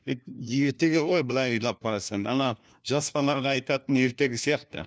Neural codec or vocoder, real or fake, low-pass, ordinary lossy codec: codec, 16 kHz, 1 kbps, FunCodec, trained on LibriTTS, 50 frames a second; fake; none; none